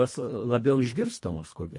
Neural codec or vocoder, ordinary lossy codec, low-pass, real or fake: codec, 24 kHz, 1.5 kbps, HILCodec; MP3, 48 kbps; 10.8 kHz; fake